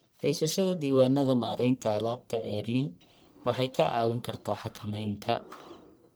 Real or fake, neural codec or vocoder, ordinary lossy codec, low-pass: fake; codec, 44.1 kHz, 1.7 kbps, Pupu-Codec; none; none